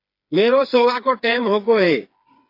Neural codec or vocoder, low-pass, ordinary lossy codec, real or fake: codec, 16 kHz, 4 kbps, FreqCodec, smaller model; 5.4 kHz; AAC, 32 kbps; fake